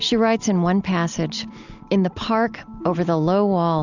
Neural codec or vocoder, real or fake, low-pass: none; real; 7.2 kHz